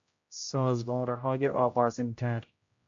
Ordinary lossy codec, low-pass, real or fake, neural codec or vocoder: MP3, 48 kbps; 7.2 kHz; fake; codec, 16 kHz, 0.5 kbps, X-Codec, HuBERT features, trained on general audio